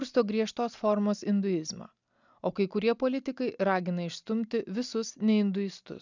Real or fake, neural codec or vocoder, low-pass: real; none; 7.2 kHz